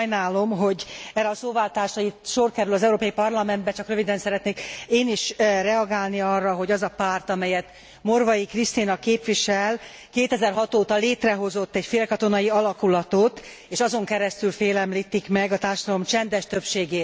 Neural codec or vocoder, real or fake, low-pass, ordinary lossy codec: none; real; none; none